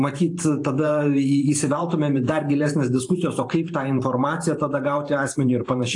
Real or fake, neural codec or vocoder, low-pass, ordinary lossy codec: fake; vocoder, 44.1 kHz, 128 mel bands every 512 samples, BigVGAN v2; 10.8 kHz; AAC, 48 kbps